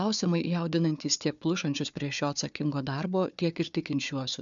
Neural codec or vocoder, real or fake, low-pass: codec, 16 kHz, 4 kbps, FunCodec, trained on Chinese and English, 50 frames a second; fake; 7.2 kHz